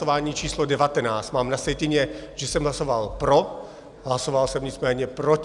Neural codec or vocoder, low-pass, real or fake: none; 10.8 kHz; real